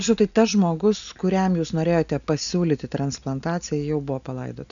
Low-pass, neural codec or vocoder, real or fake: 7.2 kHz; none; real